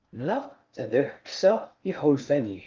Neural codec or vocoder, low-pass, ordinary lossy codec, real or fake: codec, 16 kHz in and 24 kHz out, 0.6 kbps, FocalCodec, streaming, 4096 codes; 7.2 kHz; Opus, 24 kbps; fake